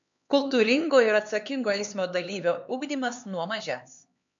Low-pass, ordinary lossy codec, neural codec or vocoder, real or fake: 7.2 kHz; MP3, 64 kbps; codec, 16 kHz, 4 kbps, X-Codec, HuBERT features, trained on LibriSpeech; fake